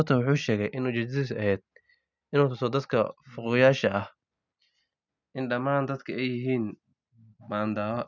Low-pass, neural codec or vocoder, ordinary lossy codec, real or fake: 7.2 kHz; none; none; real